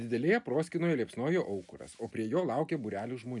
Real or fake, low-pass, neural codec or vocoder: real; 10.8 kHz; none